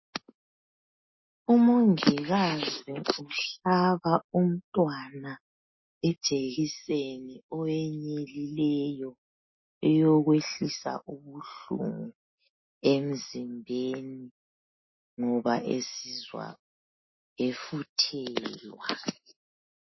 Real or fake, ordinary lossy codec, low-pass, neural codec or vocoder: real; MP3, 24 kbps; 7.2 kHz; none